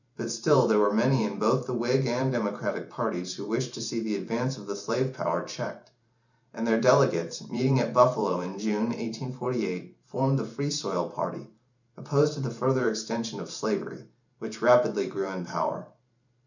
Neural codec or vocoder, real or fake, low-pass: vocoder, 44.1 kHz, 128 mel bands every 512 samples, BigVGAN v2; fake; 7.2 kHz